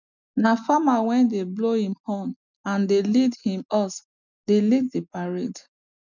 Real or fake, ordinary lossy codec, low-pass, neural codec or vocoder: real; none; 7.2 kHz; none